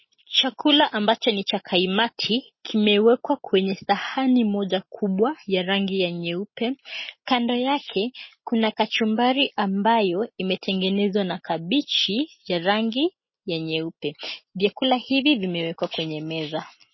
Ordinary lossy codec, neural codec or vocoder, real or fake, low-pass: MP3, 24 kbps; none; real; 7.2 kHz